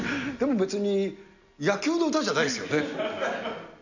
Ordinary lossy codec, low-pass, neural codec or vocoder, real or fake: none; 7.2 kHz; none; real